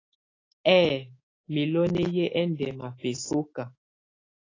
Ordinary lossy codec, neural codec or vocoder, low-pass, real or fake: AAC, 32 kbps; autoencoder, 48 kHz, 128 numbers a frame, DAC-VAE, trained on Japanese speech; 7.2 kHz; fake